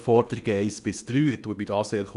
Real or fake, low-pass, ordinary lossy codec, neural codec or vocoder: fake; 10.8 kHz; none; codec, 16 kHz in and 24 kHz out, 0.8 kbps, FocalCodec, streaming, 65536 codes